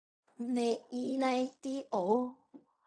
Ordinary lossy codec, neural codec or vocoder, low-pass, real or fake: AAC, 48 kbps; codec, 16 kHz in and 24 kHz out, 0.4 kbps, LongCat-Audio-Codec, fine tuned four codebook decoder; 9.9 kHz; fake